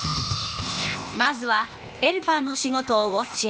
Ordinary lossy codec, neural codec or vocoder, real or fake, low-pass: none; codec, 16 kHz, 0.8 kbps, ZipCodec; fake; none